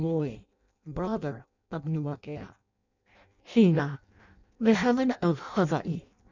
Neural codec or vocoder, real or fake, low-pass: codec, 16 kHz in and 24 kHz out, 0.6 kbps, FireRedTTS-2 codec; fake; 7.2 kHz